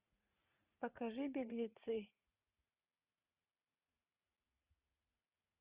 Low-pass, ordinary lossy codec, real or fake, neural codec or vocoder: 3.6 kHz; Opus, 64 kbps; fake; vocoder, 44.1 kHz, 80 mel bands, Vocos